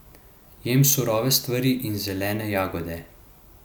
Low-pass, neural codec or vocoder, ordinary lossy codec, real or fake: none; none; none; real